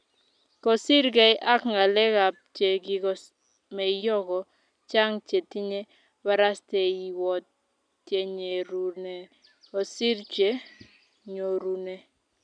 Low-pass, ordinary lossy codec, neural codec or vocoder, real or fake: 9.9 kHz; none; none; real